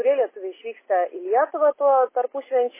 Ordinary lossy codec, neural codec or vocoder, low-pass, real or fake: MP3, 16 kbps; none; 3.6 kHz; real